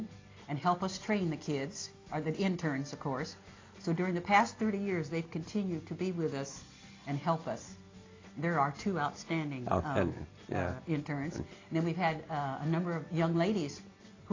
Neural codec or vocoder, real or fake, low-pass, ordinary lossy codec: none; real; 7.2 kHz; AAC, 32 kbps